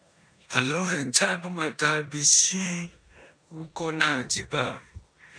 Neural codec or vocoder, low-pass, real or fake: codec, 16 kHz in and 24 kHz out, 0.9 kbps, LongCat-Audio-Codec, four codebook decoder; 9.9 kHz; fake